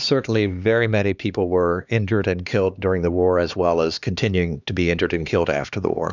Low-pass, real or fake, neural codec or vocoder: 7.2 kHz; fake; codec, 16 kHz, 2 kbps, X-Codec, HuBERT features, trained on LibriSpeech